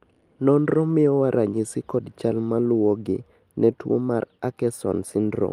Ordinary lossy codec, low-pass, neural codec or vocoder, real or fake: Opus, 32 kbps; 14.4 kHz; none; real